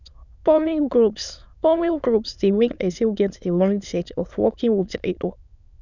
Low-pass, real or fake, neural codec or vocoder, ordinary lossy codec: 7.2 kHz; fake; autoencoder, 22.05 kHz, a latent of 192 numbers a frame, VITS, trained on many speakers; none